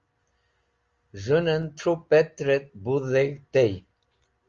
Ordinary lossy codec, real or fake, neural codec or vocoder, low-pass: Opus, 32 kbps; real; none; 7.2 kHz